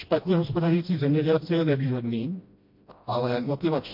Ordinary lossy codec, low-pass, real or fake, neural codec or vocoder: MP3, 32 kbps; 5.4 kHz; fake; codec, 16 kHz, 1 kbps, FreqCodec, smaller model